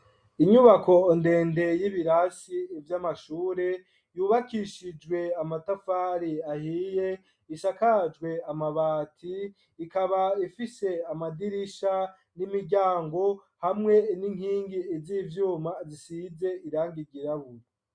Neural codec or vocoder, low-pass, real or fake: none; 9.9 kHz; real